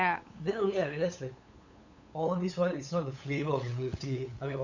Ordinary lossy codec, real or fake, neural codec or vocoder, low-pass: none; fake; codec, 16 kHz, 8 kbps, FunCodec, trained on LibriTTS, 25 frames a second; 7.2 kHz